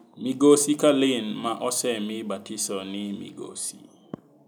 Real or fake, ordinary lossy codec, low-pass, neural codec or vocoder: real; none; none; none